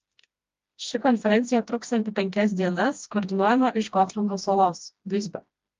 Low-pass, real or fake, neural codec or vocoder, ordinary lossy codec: 7.2 kHz; fake; codec, 16 kHz, 1 kbps, FreqCodec, smaller model; Opus, 32 kbps